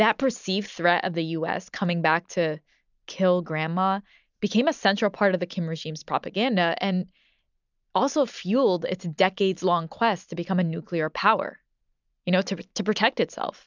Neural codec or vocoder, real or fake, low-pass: none; real; 7.2 kHz